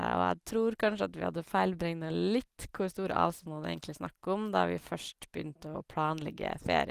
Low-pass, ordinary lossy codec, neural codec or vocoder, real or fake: 14.4 kHz; Opus, 24 kbps; none; real